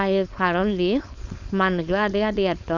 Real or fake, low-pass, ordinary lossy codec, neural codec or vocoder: fake; 7.2 kHz; none; codec, 16 kHz, 4.8 kbps, FACodec